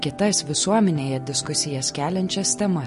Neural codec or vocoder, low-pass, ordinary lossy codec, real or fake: none; 14.4 kHz; MP3, 48 kbps; real